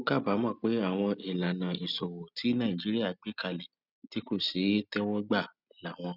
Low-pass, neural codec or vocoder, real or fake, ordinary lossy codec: 5.4 kHz; none; real; none